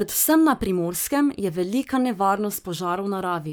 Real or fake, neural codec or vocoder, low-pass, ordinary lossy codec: fake; codec, 44.1 kHz, 7.8 kbps, Pupu-Codec; none; none